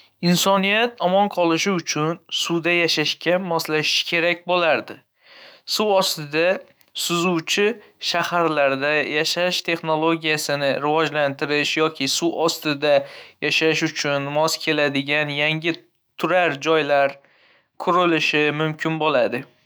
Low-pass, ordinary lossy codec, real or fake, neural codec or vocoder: none; none; fake; autoencoder, 48 kHz, 128 numbers a frame, DAC-VAE, trained on Japanese speech